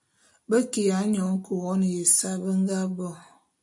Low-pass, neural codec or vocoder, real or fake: 10.8 kHz; none; real